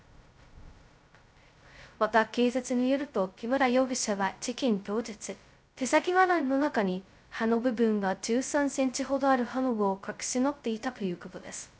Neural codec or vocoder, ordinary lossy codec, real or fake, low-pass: codec, 16 kHz, 0.2 kbps, FocalCodec; none; fake; none